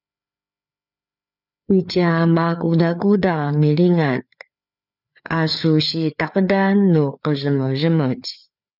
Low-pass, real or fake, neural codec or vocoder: 5.4 kHz; fake; codec, 16 kHz, 4 kbps, FreqCodec, larger model